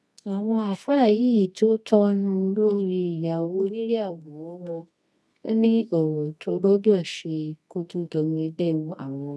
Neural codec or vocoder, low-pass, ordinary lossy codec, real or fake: codec, 24 kHz, 0.9 kbps, WavTokenizer, medium music audio release; none; none; fake